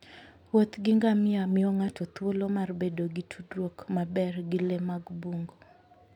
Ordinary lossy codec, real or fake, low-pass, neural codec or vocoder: none; real; 19.8 kHz; none